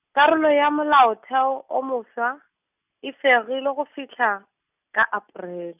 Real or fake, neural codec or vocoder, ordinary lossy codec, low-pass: real; none; none; 3.6 kHz